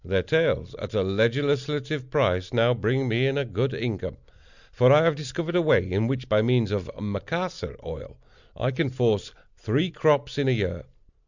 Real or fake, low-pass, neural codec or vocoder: real; 7.2 kHz; none